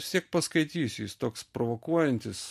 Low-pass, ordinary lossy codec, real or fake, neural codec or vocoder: 14.4 kHz; MP3, 64 kbps; real; none